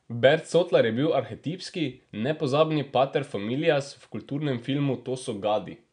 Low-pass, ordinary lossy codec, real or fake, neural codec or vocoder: 9.9 kHz; none; real; none